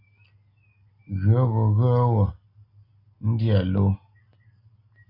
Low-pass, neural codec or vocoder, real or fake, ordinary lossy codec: 5.4 kHz; none; real; AAC, 32 kbps